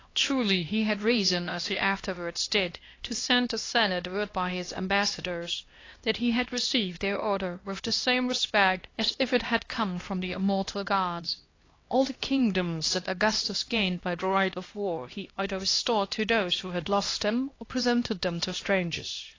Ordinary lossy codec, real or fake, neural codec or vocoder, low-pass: AAC, 32 kbps; fake; codec, 16 kHz, 1 kbps, X-Codec, HuBERT features, trained on LibriSpeech; 7.2 kHz